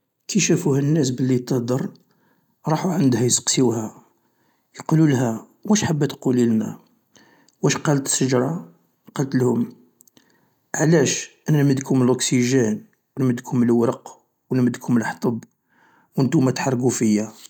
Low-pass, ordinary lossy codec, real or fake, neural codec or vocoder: 19.8 kHz; none; real; none